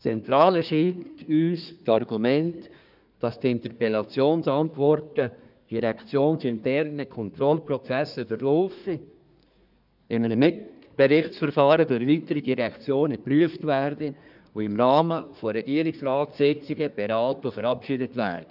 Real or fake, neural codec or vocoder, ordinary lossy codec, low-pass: fake; codec, 24 kHz, 1 kbps, SNAC; none; 5.4 kHz